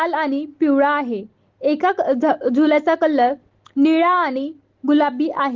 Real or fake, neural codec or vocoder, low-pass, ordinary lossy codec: real; none; 7.2 kHz; Opus, 16 kbps